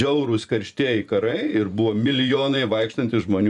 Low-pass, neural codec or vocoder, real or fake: 10.8 kHz; vocoder, 24 kHz, 100 mel bands, Vocos; fake